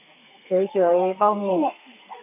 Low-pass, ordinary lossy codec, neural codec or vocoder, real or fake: 3.6 kHz; none; codec, 32 kHz, 1.9 kbps, SNAC; fake